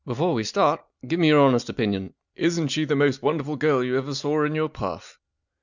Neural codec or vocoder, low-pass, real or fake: none; 7.2 kHz; real